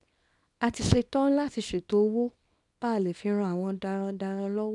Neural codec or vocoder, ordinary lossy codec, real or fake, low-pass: codec, 24 kHz, 0.9 kbps, WavTokenizer, small release; none; fake; 10.8 kHz